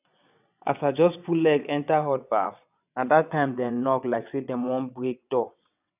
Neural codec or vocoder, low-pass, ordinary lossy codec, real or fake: vocoder, 22.05 kHz, 80 mel bands, WaveNeXt; 3.6 kHz; none; fake